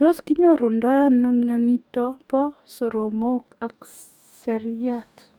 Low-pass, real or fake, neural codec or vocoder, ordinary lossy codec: 19.8 kHz; fake; codec, 44.1 kHz, 2.6 kbps, DAC; none